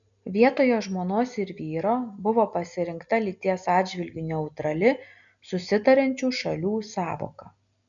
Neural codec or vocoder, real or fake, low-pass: none; real; 7.2 kHz